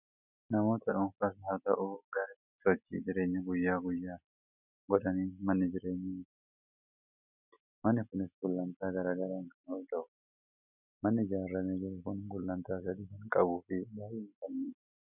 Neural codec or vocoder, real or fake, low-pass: none; real; 3.6 kHz